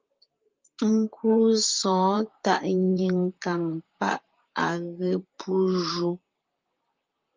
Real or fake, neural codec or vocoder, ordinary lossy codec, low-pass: fake; vocoder, 44.1 kHz, 128 mel bands, Pupu-Vocoder; Opus, 32 kbps; 7.2 kHz